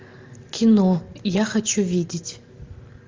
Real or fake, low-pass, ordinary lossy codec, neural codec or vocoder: real; 7.2 kHz; Opus, 32 kbps; none